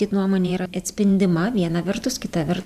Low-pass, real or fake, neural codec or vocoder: 14.4 kHz; fake; vocoder, 44.1 kHz, 128 mel bands every 512 samples, BigVGAN v2